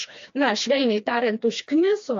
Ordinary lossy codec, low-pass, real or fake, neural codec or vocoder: AAC, 48 kbps; 7.2 kHz; fake; codec, 16 kHz, 2 kbps, FreqCodec, smaller model